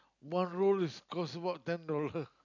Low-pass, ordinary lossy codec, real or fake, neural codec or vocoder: 7.2 kHz; none; real; none